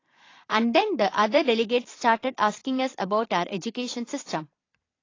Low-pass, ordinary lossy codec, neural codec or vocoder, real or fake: 7.2 kHz; AAC, 32 kbps; vocoder, 22.05 kHz, 80 mel bands, Vocos; fake